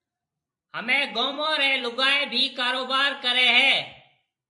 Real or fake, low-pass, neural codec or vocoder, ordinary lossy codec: fake; 10.8 kHz; vocoder, 44.1 kHz, 128 mel bands every 256 samples, BigVGAN v2; MP3, 48 kbps